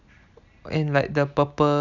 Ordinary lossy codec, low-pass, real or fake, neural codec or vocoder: none; 7.2 kHz; real; none